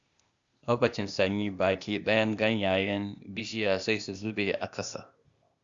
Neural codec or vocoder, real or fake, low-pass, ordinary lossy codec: codec, 16 kHz, 0.8 kbps, ZipCodec; fake; 7.2 kHz; Opus, 64 kbps